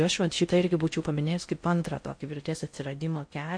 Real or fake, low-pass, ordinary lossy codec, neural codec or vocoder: fake; 9.9 kHz; MP3, 48 kbps; codec, 16 kHz in and 24 kHz out, 0.6 kbps, FocalCodec, streaming, 4096 codes